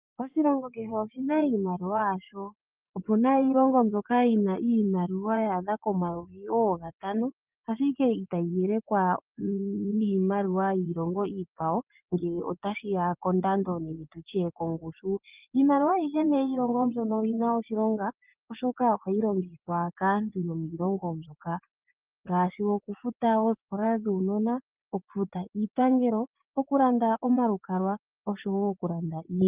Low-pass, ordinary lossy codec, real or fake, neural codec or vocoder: 3.6 kHz; Opus, 32 kbps; fake; vocoder, 24 kHz, 100 mel bands, Vocos